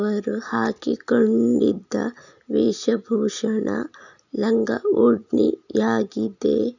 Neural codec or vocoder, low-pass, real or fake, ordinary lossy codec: none; 7.2 kHz; real; none